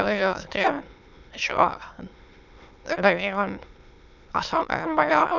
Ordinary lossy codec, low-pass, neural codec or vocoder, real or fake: none; 7.2 kHz; autoencoder, 22.05 kHz, a latent of 192 numbers a frame, VITS, trained on many speakers; fake